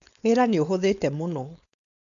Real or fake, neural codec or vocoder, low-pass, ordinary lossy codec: fake; codec, 16 kHz, 4.8 kbps, FACodec; 7.2 kHz; none